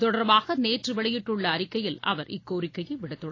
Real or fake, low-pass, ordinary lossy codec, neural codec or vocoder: real; 7.2 kHz; AAC, 32 kbps; none